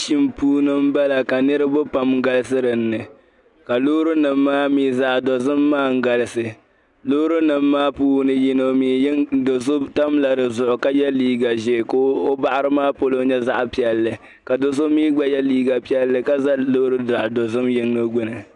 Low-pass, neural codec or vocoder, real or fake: 10.8 kHz; none; real